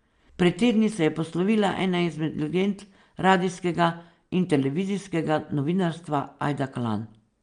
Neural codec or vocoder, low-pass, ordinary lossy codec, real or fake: none; 9.9 kHz; Opus, 32 kbps; real